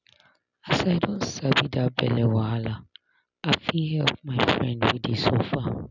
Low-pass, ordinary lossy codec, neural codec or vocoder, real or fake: 7.2 kHz; none; none; real